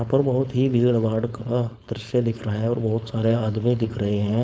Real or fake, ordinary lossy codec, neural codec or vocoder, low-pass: fake; none; codec, 16 kHz, 4.8 kbps, FACodec; none